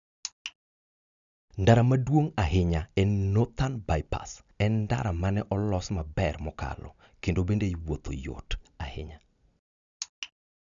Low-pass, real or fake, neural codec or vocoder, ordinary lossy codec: 7.2 kHz; real; none; none